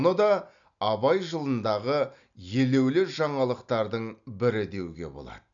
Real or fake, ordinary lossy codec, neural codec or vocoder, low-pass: real; none; none; 7.2 kHz